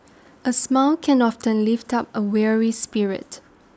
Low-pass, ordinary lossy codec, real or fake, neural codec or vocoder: none; none; real; none